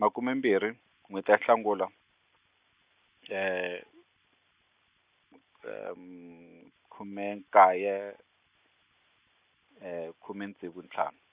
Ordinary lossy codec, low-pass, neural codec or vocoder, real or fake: Opus, 64 kbps; 3.6 kHz; none; real